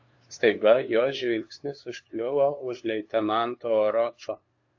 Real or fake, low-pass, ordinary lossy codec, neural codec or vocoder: fake; 7.2 kHz; AAC, 48 kbps; codec, 16 kHz, 2 kbps, FunCodec, trained on LibriTTS, 25 frames a second